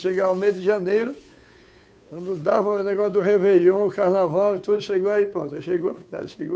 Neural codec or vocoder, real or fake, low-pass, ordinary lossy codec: codec, 16 kHz, 2 kbps, FunCodec, trained on Chinese and English, 25 frames a second; fake; none; none